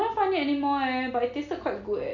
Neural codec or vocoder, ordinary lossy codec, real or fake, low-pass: none; none; real; 7.2 kHz